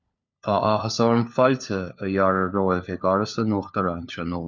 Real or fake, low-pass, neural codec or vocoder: fake; 7.2 kHz; codec, 16 kHz, 4 kbps, FunCodec, trained on LibriTTS, 50 frames a second